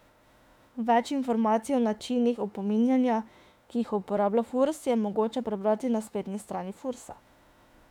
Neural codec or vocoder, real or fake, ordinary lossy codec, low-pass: autoencoder, 48 kHz, 32 numbers a frame, DAC-VAE, trained on Japanese speech; fake; none; 19.8 kHz